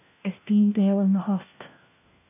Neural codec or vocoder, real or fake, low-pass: codec, 16 kHz, 1 kbps, FunCodec, trained on LibriTTS, 50 frames a second; fake; 3.6 kHz